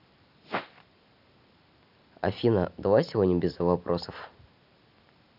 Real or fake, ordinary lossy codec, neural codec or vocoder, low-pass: real; none; none; 5.4 kHz